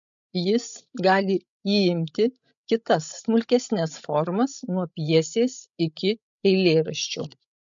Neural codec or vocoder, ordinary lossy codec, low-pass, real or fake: codec, 16 kHz, 16 kbps, FreqCodec, larger model; MP3, 64 kbps; 7.2 kHz; fake